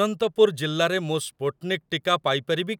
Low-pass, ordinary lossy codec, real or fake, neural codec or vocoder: 19.8 kHz; none; real; none